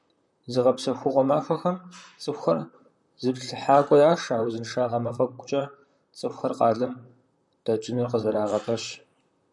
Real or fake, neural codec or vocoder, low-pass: fake; vocoder, 44.1 kHz, 128 mel bands, Pupu-Vocoder; 10.8 kHz